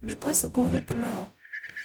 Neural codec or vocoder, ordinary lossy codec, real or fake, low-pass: codec, 44.1 kHz, 0.9 kbps, DAC; none; fake; none